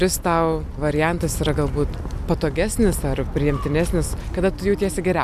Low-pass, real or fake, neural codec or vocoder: 14.4 kHz; real; none